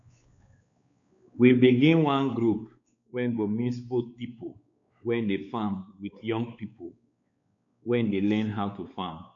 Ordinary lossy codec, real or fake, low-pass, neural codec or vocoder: MP3, 64 kbps; fake; 7.2 kHz; codec, 16 kHz, 4 kbps, X-Codec, WavLM features, trained on Multilingual LibriSpeech